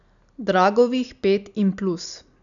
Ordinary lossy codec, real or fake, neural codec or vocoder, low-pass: none; real; none; 7.2 kHz